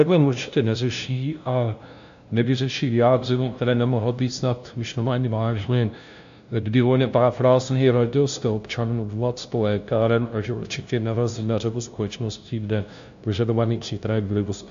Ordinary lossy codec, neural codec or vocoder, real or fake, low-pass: AAC, 64 kbps; codec, 16 kHz, 0.5 kbps, FunCodec, trained on LibriTTS, 25 frames a second; fake; 7.2 kHz